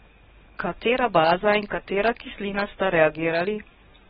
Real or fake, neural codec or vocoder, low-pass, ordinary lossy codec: fake; codec, 44.1 kHz, 7.8 kbps, DAC; 19.8 kHz; AAC, 16 kbps